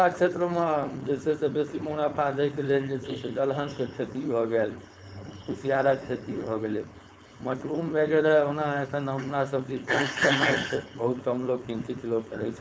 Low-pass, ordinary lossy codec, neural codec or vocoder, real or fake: none; none; codec, 16 kHz, 4.8 kbps, FACodec; fake